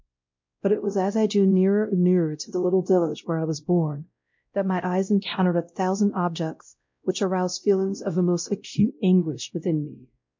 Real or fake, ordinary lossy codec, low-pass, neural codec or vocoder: fake; MP3, 48 kbps; 7.2 kHz; codec, 16 kHz, 0.5 kbps, X-Codec, WavLM features, trained on Multilingual LibriSpeech